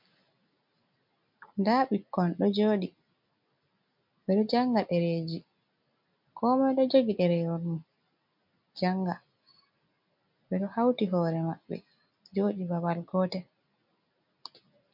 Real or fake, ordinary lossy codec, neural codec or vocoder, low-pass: real; MP3, 48 kbps; none; 5.4 kHz